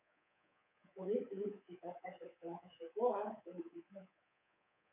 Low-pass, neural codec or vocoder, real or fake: 3.6 kHz; codec, 24 kHz, 3.1 kbps, DualCodec; fake